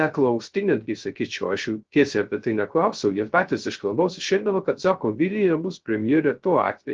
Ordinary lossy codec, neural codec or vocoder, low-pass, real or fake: Opus, 16 kbps; codec, 16 kHz, 0.3 kbps, FocalCodec; 7.2 kHz; fake